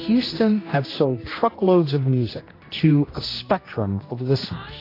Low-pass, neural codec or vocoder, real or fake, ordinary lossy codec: 5.4 kHz; codec, 16 kHz, 1 kbps, X-Codec, HuBERT features, trained on general audio; fake; AAC, 24 kbps